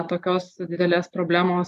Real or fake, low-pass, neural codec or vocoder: real; 14.4 kHz; none